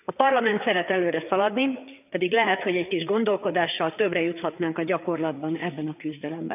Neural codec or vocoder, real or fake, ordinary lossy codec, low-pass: codec, 16 kHz, 4 kbps, FreqCodec, larger model; fake; none; 3.6 kHz